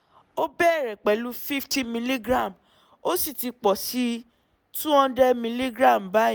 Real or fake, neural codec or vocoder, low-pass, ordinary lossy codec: real; none; none; none